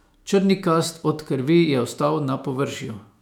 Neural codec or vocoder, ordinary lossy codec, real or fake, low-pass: autoencoder, 48 kHz, 128 numbers a frame, DAC-VAE, trained on Japanese speech; none; fake; 19.8 kHz